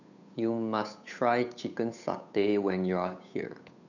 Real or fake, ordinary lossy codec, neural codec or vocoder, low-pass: fake; none; codec, 16 kHz, 8 kbps, FunCodec, trained on Chinese and English, 25 frames a second; 7.2 kHz